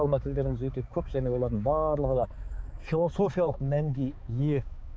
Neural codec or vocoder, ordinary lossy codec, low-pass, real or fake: codec, 16 kHz, 4 kbps, X-Codec, HuBERT features, trained on balanced general audio; none; none; fake